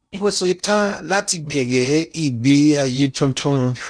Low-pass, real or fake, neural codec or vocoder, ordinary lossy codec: 9.9 kHz; fake; codec, 16 kHz in and 24 kHz out, 0.6 kbps, FocalCodec, streaming, 2048 codes; none